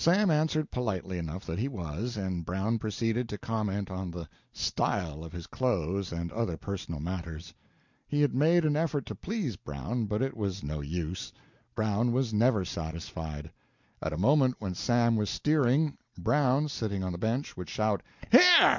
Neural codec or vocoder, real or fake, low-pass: none; real; 7.2 kHz